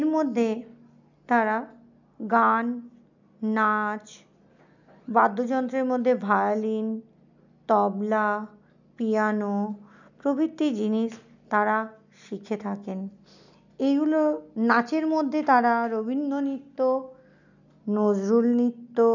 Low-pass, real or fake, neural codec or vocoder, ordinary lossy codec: 7.2 kHz; real; none; none